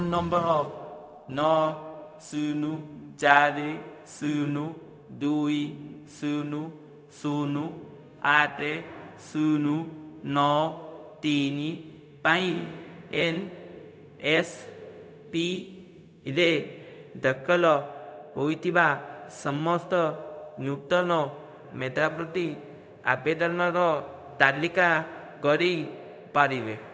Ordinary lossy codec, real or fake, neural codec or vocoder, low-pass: none; fake; codec, 16 kHz, 0.4 kbps, LongCat-Audio-Codec; none